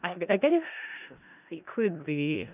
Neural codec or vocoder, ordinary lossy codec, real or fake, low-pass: codec, 16 kHz in and 24 kHz out, 0.4 kbps, LongCat-Audio-Codec, four codebook decoder; none; fake; 3.6 kHz